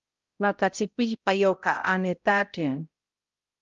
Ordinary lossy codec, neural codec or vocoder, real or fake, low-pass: Opus, 32 kbps; codec, 16 kHz, 0.5 kbps, X-Codec, HuBERT features, trained on balanced general audio; fake; 7.2 kHz